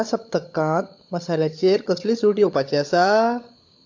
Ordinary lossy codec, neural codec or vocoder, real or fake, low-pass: AAC, 48 kbps; codec, 16 kHz, 16 kbps, FunCodec, trained on LibriTTS, 50 frames a second; fake; 7.2 kHz